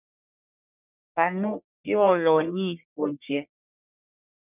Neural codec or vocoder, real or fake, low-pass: codec, 44.1 kHz, 1.7 kbps, Pupu-Codec; fake; 3.6 kHz